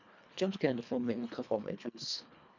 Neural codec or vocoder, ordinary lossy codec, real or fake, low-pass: codec, 24 kHz, 1.5 kbps, HILCodec; none; fake; 7.2 kHz